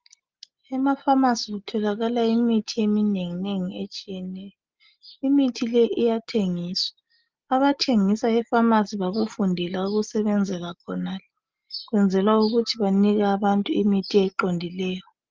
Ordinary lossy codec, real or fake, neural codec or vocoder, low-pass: Opus, 24 kbps; real; none; 7.2 kHz